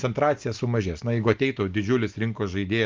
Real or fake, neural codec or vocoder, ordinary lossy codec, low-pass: real; none; Opus, 32 kbps; 7.2 kHz